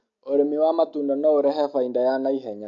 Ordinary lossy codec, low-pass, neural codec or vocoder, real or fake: AAC, 48 kbps; 7.2 kHz; none; real